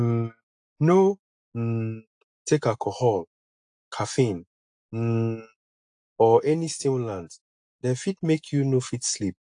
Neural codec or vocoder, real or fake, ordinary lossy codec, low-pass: none; real; AAC, 64 kbps; 9.9 kHz